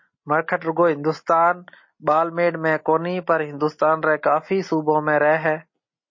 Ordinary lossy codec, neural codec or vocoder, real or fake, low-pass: MP3, 32 kbps; none; real; 7.2 kHz